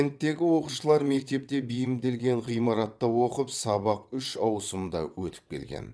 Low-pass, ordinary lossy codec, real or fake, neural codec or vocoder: none; none; fake; vocoder, 22.05 kHz, 80 mel bands, WaveNeXt